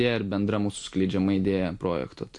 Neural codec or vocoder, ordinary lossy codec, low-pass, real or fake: none; MP3, 48 kbps; 10.8 kHz; real